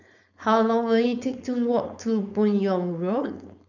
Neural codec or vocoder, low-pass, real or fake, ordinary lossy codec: codec, 16 kHz, 4.8 kbps, FACodec; 7.2 kHz; fake; none